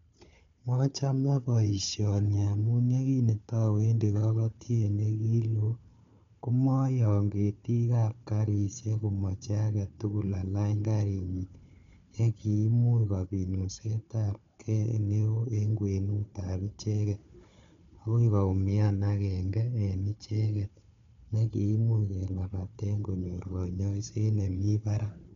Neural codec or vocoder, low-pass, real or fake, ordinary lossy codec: codec, 16 kHz, 4 kbps, FunCodec, trained on Chinese and English, 50 frames a second; 7.2 kHz; fake; MP3, 64 kbps